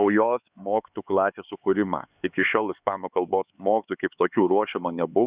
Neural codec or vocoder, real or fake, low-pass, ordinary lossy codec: codec, 16 kHz, 4 kbps, X-Codec, HuBERT features, trained on LibriSpeech; fake; 3.6 kHz; Opus, 64 kbps